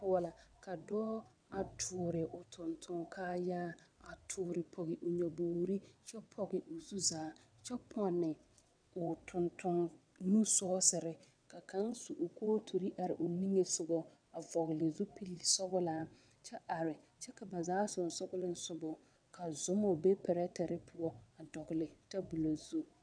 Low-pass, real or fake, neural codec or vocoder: 9.9 kHz; fake; vocoder, 22.05 kHz, 80 mel bands, WaveNeXt